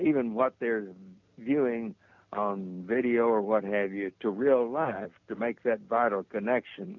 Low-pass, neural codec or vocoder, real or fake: 7.2 kHz; none; real